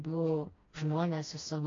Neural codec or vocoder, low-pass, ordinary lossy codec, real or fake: codec, 16 kHz, 1 kbps, FreqCodec, smaller model; 7.2 kHz; AAC, 32 kbps; fake